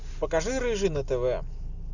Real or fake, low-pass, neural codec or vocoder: real; 7.2 kHz; none